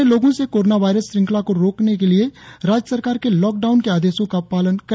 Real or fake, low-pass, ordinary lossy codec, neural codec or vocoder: real; none; none; none